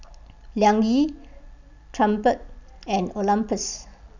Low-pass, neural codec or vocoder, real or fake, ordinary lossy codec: 7.2 kHz; none; real; none